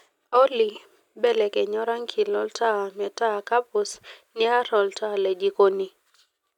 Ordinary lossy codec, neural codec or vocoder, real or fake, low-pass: none; none; real; 19.8 kHz